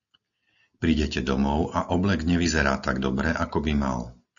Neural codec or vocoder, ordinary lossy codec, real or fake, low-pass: none; MP3, 96 kbps; real; 7.2 kHz